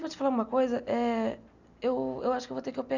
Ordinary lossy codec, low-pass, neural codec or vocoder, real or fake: none; 7.2 kHz; none; real